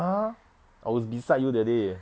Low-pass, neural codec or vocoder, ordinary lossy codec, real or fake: none; none; none; real